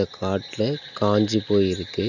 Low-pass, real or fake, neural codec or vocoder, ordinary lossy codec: 7.2 kHz; real; none; none